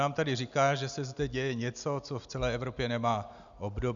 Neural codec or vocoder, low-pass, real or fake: none; 7.2 kHz; real